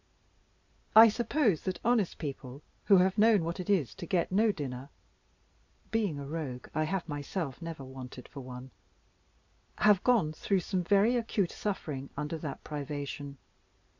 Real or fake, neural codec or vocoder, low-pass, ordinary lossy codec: real; none; 7.2 kHz; MP3, 64 kbps